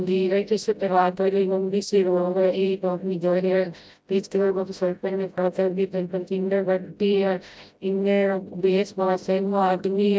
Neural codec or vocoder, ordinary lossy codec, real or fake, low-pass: codec, 16 kHz, 0.5 kbps, FreqCodec, smaller model; none; fake; none